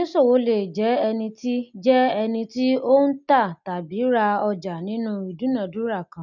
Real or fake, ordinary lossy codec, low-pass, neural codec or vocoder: real; none; 7.2 kHz; none